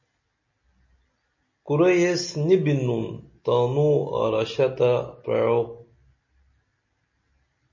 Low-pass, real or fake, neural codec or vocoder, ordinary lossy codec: 7.2 kHz; real; none; MP3, 32 kbps